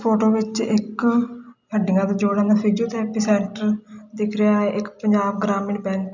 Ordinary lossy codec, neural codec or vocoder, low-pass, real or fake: none; none; 7.2 kHz; real